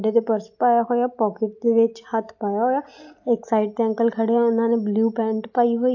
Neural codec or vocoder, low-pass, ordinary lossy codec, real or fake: none; 7.2 kHz; AAC, 48 kbps; real